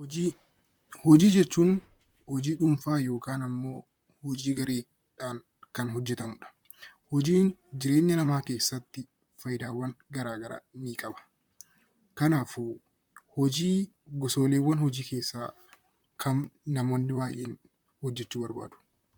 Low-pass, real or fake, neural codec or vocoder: 19.8 kHz; fake; vocoder, 44.1 kHz, 128 mel bands, Pupu-Vocoder